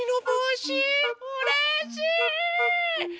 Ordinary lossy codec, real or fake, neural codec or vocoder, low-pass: none; real; none; none